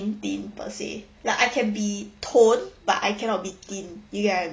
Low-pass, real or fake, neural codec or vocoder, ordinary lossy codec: none; real; none; none